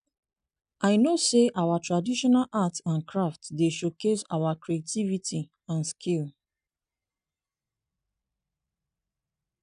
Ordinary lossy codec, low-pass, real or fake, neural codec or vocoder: none; 9.9 kHz; real; none